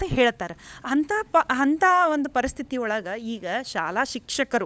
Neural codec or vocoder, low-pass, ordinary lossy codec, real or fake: codec, 16 kHz, 16 kbps, FunCodec, trained on LibriTTS, 50 frames a second; none; none; fake